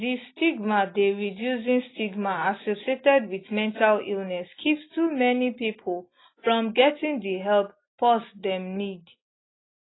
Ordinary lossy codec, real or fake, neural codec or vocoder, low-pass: AAC, 16 kbps; real; none; 7.2 kHz